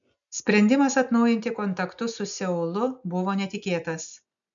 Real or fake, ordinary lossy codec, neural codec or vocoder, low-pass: real; MP3, 96 kbps; none; 7.2 kHz